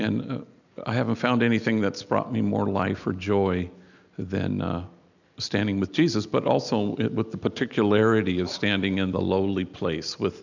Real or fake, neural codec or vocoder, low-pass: real; none; 7.2 kHz